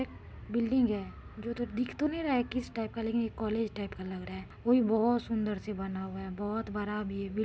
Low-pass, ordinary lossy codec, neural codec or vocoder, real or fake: none; none; none; real